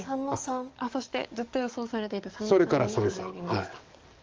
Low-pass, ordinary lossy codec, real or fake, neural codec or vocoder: 7.2 kHz; Opus, 24 kbps; fake; codec, 44.1 kHz, 7.8 kbps, DAC